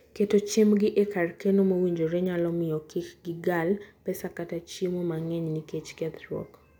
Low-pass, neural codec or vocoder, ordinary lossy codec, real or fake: 19.8 kHz; none; none; real